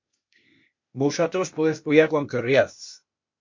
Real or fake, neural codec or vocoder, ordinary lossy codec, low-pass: fake; codec, 16 kHz, 0.8 kbps, ZipCodec; MP3, 48 kbps; 7.2 kHz